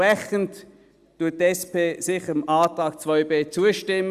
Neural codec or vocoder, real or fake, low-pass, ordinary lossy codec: none; real; 14.4 kHz; Opus, 64 kbps